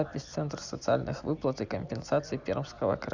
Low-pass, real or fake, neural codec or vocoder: 7.2 kHz; real; none